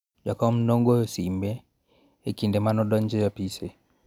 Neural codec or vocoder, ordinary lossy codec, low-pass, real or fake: none; none; 19.8 kHz; real